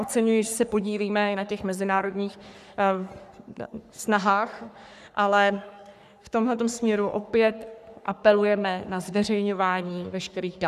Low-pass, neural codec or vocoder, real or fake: 14.4 kHz; codec, 44.1 kHz, 3.4 kbps, Pupu-Codec; fake